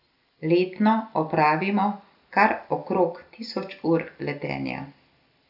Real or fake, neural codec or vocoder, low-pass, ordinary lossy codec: real; none; 5.4 kHz; none